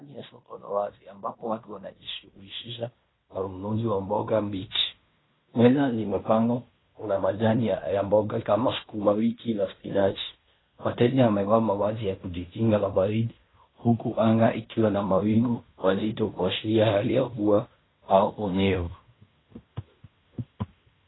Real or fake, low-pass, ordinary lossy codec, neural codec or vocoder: fake; 7.2 kHz; AAC, 16 kbps; codec, 16 kHz in and 24 kHz out, 0.9 kbps, LongCat-Audio-Codec, fine tuned four codebook decoder